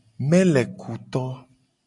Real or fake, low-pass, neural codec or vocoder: real; 10.8 kHz; none